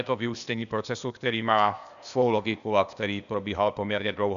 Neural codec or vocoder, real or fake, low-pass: codec, 16 kHz, 0.8 kbps, ZipCodec; fake; 7.2 kHz